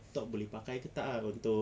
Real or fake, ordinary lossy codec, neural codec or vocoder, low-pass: real; none; none; none